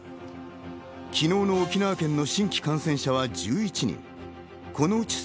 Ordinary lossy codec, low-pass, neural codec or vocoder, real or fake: none; none; none; real